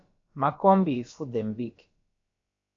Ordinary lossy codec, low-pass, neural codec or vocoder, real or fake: MP3, 48 kbps; 7.2 kHz; codec, 16 kHz, about 1 kbps, DyCAST, with the encoder's durations; fake